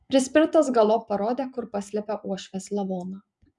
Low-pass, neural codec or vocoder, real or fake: 10.8 kHz; vocoder, 24 kHz, 100 mel bands, Vocos; fake